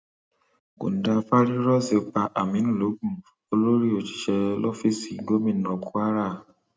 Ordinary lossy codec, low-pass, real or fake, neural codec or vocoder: none; none; real; none